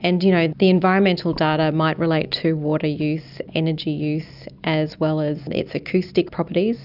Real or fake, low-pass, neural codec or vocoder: real; 5.4 kHz; none